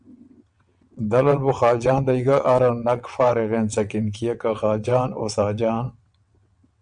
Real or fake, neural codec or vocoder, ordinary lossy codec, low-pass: fake; vocoder, 22.05 kHz, 80 mel bands, WaveNeXt; MP3, 96 kbps; 9.9 kHz